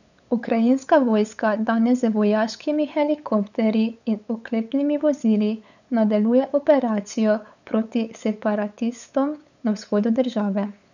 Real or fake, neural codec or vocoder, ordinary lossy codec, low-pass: fake; codec, 16 kHz, 8 kbps, FunCodec, trained on LibriTTS, 25 frames a second; none; 7.2 kHz